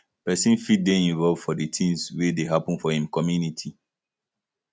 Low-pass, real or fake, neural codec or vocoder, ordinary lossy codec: none; real; none; none